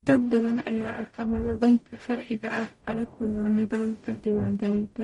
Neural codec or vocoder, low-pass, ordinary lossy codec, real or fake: codec, 44.1 kHz, 0.9 kbps, DAC; 19.8 kHz; MP3, 48 kbps; fake